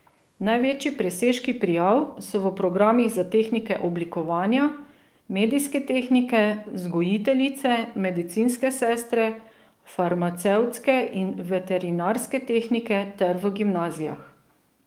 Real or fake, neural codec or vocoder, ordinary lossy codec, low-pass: fake; codec, 44.1 kHz, 7.8 kbps, DAC; Opus, 32 kbps; 19.8 kHz